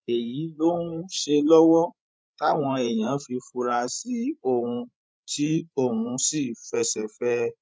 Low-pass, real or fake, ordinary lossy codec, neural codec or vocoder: none; fake; none; codec, 16 kHz, 16 kbps, FreqCodec, larger model